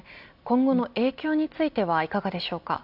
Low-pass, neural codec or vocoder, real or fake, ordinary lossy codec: 5.4 kHz; none; real; none